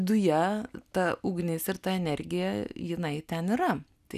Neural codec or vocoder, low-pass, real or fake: none; 14.4 kHz; real